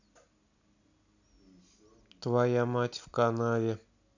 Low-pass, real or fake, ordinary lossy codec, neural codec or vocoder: 7.2 kHz; real; none; none